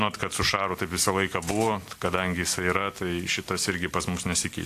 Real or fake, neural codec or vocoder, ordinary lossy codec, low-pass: real; none; AAC, 64 kbps; 14.4 kHz